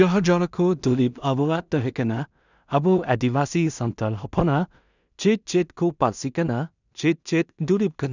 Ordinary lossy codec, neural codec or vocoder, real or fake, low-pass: none; codec, 16 kHz in and 24 kHz out, 0.4 kbps, LongCat-Audio-Codec, two codebook decoder; fake; 7.2 kHz